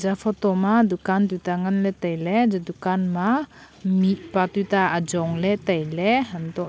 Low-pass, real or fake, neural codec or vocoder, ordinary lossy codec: none; real; none; none